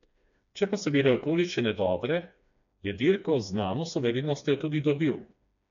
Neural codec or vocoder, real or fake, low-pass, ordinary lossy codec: codec, 16 kHz, 2 kbps, FreqCodec, smaller model; fake; 7.2 kHz; none